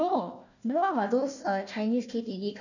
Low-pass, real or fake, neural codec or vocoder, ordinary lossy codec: 7.2 kHz; fake; codec, 16 kHz, 1 kbps, FunCodec, trained on Chinese and English, 50 frames a second; none